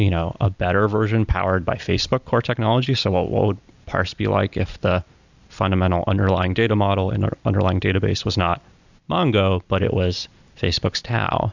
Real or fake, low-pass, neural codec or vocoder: real; 7.2 kHz; none